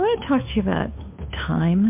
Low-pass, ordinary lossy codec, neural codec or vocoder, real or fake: 3.6 kHz; MP3, 32 kbps; codec, 16 kHz, 2 kbps, FunCodec, trained on Chinese and English, 25 frames a second; fake